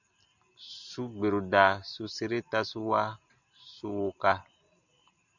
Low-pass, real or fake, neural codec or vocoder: 7.2 kHz; real; none